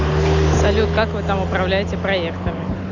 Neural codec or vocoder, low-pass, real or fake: none; 7.2 kHz; real